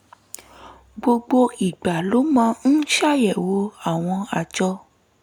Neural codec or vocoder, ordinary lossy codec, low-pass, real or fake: none; none; 19.8 kHz; real